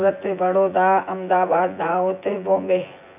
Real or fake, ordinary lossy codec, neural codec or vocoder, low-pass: fake; none; vocoder, 24 kHz, 100 mel bands, Vocos; 3.6 kHz